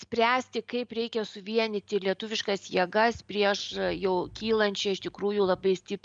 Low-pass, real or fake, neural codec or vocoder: 9.9 kHz; real; none